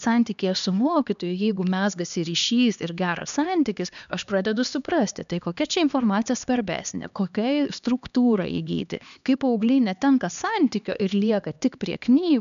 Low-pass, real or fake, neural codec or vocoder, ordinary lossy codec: 7.2 kHz; fake; codec, 16 kHz, 2 kbps, X-Codec, HuBERT features, trained on LibriSpeech; MP3, 96 kbps